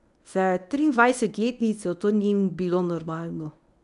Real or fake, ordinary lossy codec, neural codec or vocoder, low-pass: fake; none; codec, 24 kHz, 0.9 kbps, WavTokenizer, medium speech release version 1; 10.8 kHz